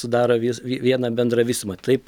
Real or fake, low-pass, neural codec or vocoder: real; 19.8 kHz; none